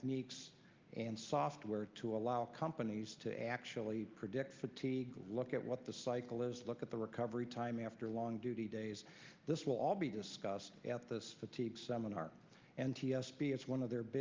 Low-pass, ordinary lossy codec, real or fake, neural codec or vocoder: 7.2 kHz; Opus, 32 kbps; real; none